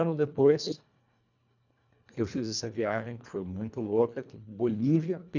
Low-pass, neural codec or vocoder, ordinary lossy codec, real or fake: 7.2 kHz; codec, 24 kHz, 1.5 kbps, HILCodec; none; fake